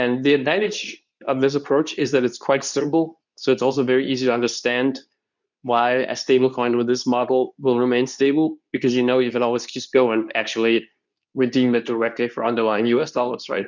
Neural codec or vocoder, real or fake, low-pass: codec, 24 kHz, 0.9 kbps, WavTokenizer, medium speech release version 2; fake; 7.2 kHz